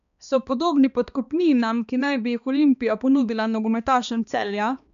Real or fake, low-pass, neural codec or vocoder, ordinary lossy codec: fake; 7.2 kHz; codec, 16 kHz, 2 kbps, X-Codec, HuBERT features, trained on balanced general audio; none